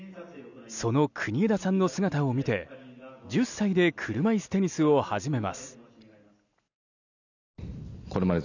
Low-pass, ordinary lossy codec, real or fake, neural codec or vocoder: 7.2 kHz; none; real; none